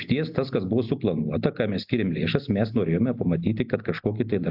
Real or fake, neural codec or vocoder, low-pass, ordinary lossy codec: real; none; 5.4 kHz; AAC, 48 kbps